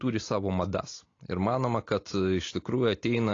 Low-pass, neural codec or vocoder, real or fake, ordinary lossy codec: 7.2 kHz; none; real; AAC, 32 kbps